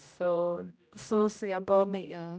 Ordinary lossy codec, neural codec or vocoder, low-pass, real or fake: none; codec, 16 kHz, 0.5 kbps, X-Codec, HuBERT features, trained on general audio; none; fake